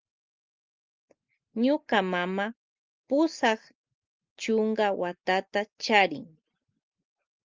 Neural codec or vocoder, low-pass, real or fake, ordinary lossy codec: none; 7.2 kHz; real; Opus, 32 kbps